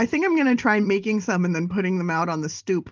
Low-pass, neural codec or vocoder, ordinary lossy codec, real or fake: 7.2 kHz; none; Opus, 24 kbps; real